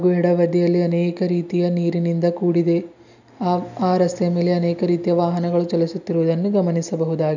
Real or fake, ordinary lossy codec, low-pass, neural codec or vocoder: real; none; 7.2 kHz; none